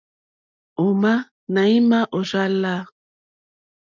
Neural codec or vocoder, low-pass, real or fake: none; 7.2 kHz; real